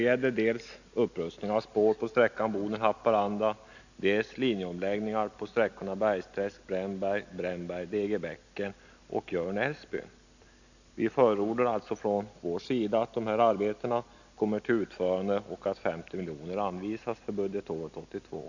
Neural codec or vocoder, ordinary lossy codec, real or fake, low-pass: none; none; real; 7.2 kHz